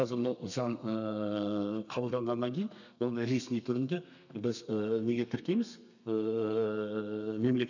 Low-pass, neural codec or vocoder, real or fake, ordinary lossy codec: 7.2 kHz; codec, 32 kHz, 1.9 kbps, SNAC; fake; none